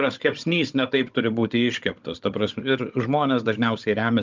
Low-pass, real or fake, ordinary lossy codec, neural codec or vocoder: 7.2 kHz; fake; Opus, 24 kbps; codec, 16 kHz, 16 kbps, FunCodec, trained on Chinese and English, 50 frames a second